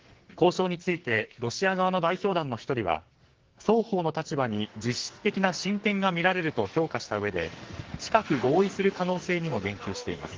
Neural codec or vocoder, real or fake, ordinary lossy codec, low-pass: codec, 44.1 kHz, 2.6 kbps, SNAC; fake; Opus, 16 kbps; 7.2 kHz